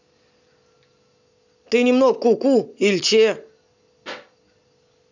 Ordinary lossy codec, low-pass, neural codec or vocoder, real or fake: none; 7.2 kHz; none; real